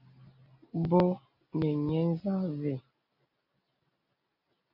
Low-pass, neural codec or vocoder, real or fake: 5.4 kHz; none; real